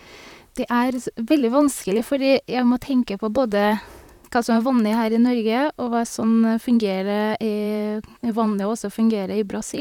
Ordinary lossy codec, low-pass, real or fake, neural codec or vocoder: none; 19.8 kHz; fake; vocoder, 44.1 kHz, 128 mel bands, Pupu-Vocoder